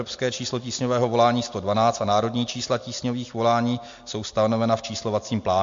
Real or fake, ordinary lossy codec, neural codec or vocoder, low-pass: real; MP3, 48 kbps; none; 7.2 kHz